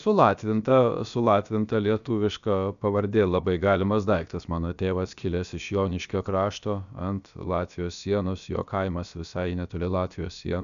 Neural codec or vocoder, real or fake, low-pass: codec, 16 kHz, about 1 kbps, DyCAST, with the encoder's durations; fake; 7.2 kHz